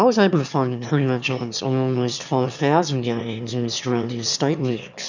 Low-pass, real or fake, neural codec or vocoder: 7.2 kHz; fake; autoencoder, 22.05 kHz, a latent of 192 numbers a frame, VITS, trained on one speaker